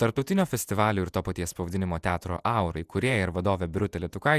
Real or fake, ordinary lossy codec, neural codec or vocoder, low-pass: fake; Opus, 64 kbps; vocoder, 48 kHz, 128 mel bands, Vocos; 14.4 kHz